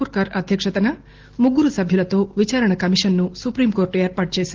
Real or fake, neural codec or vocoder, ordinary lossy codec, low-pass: real; none; Opus, 16 kbps; 7.2 kHz